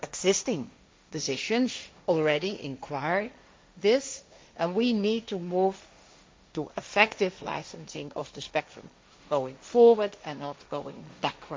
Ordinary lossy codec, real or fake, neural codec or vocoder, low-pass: none; fake; codec, 16 kHz, 1.1 kbps, Voila-Tokenizer; none